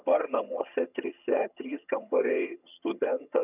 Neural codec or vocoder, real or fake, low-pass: vocoder, 22.05 kHz, 80 mel bands, HiFi-GAN; fake; 3.6 kHz